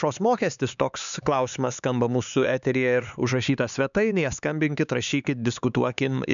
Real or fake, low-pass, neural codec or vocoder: fake; 7.2 kHz; codec, 16 kHz, 4 kbps, X-Codec, HuBERT features, trained on LibriSpeech